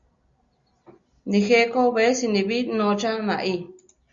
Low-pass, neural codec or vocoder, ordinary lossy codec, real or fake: 7.2 kHz; none; Opus, 64 kbps; real